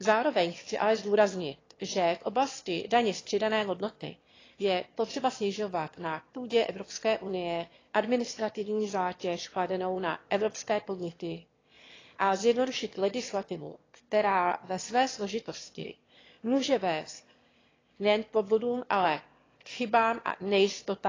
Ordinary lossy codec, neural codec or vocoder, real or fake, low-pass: AAC, 32 kbps; autoencoder, 22.05 kHz, a latent of 192 numbers a frame, VITS, trained on one speaker; fake; 7.2 kHz